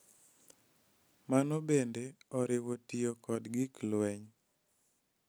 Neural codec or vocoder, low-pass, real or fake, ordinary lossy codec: none; none; real; none